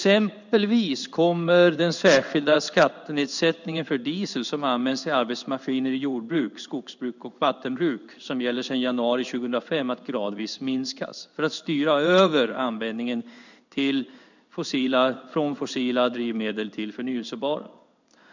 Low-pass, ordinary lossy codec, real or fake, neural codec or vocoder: 7.2 kHz; none; fake; codec, 16 kHz in and 24 kHz out, 1 kbps, XY-Tokenizer